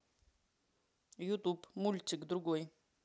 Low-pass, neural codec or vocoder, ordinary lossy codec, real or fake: none; none; none; real